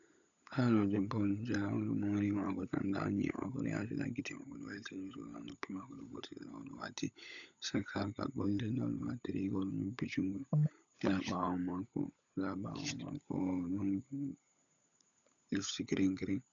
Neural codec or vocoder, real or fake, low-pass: codec, 16 kHz, 16 kbps, FunCodec, trained on LibriTTS, 50 frames a second; fake; 7.2 kHz